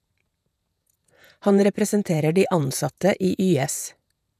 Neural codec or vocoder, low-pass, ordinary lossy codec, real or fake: vocoder, 48 kHz, 128 mel bands, Vocos; 14.4 kHz; none; fake